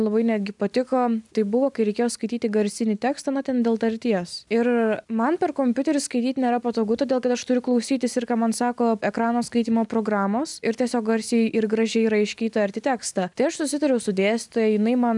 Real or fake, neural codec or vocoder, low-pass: real; none; 10.8 kHz